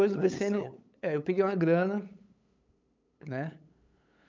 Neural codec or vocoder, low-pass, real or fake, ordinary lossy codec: codec, 16 kHz, 8 kbps, FunCodec, trained on LibriTTS, 25 frames a second; 7.2 kHz; fake; MP3, 64 kbps